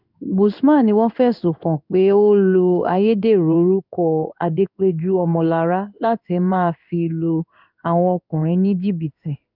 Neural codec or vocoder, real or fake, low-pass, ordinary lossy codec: codec, 16 kHz in and 24 kHz out, 1 kbps, XY-Tokenizer; fake; 5.4 kHz; none